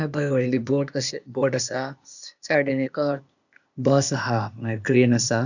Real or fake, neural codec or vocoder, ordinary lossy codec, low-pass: fake; codec, 16 kHz, 0.8 kbps, ZipCodec; none; 7.2 kHz